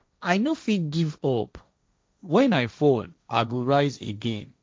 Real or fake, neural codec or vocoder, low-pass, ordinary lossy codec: fake; codec, 16 kHz, 1.1 kbps, Voila-Tokenizer; none; none